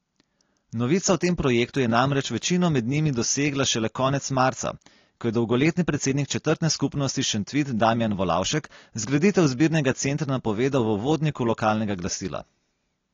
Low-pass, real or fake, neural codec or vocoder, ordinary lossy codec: 7.2 kHz; real; none; AAC, 32 kbps